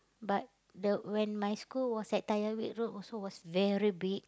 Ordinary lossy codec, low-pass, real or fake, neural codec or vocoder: none; none; real; none